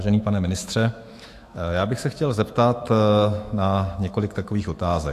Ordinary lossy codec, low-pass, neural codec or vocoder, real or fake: AAC, 64 kbps; 14.4 kHz; autoencoder, 48 kHz, 128 numbers a frame, DAC-VAE, trained on Japanese speech; fake